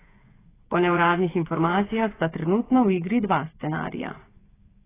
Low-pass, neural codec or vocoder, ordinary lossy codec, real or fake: 3.6 kHz; codec, 16 kHz, 8 kbps, FreqCodec, smaller model; AAC, 16 kbps; fake